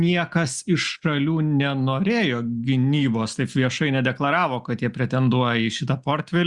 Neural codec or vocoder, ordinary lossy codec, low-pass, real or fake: none; Opus, 64 kbps; 10.8 kHz; real